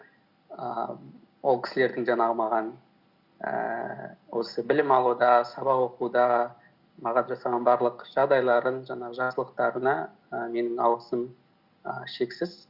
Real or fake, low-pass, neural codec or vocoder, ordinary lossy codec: real; 5.4 kHz; none; Opus, 64 kbps